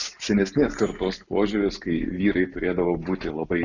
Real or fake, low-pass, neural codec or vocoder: real; 7.2 kHz; none